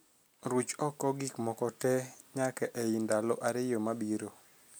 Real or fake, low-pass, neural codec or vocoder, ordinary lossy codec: real; none; none; none